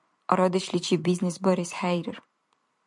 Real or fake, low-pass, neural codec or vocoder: fake; 10.8 kHz; vocoder, 44.1 kHz, 128 mel bands every 256 samples, BigVGAN v2